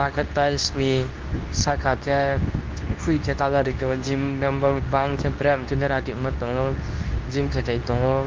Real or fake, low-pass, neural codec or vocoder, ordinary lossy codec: fake; 7.2 kHz; codec, 24 kHz, 0.9 kbps, WavTokenizer, medium speech release version 2; Opus, 24 kbps